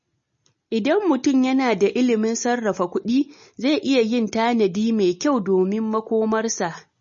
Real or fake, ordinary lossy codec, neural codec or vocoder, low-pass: real; MP3, 32 kbps; none; 7.2 kHz